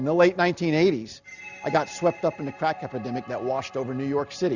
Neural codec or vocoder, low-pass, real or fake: none; 7.2 kHz; real